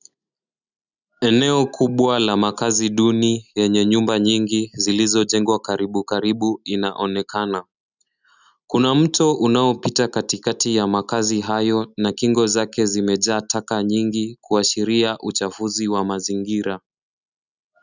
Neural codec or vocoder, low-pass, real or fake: none; 7.2 kHz; real